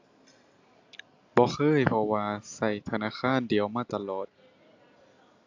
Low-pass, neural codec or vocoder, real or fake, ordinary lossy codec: 7.2 kHz; none; real; AAC, 48 kbps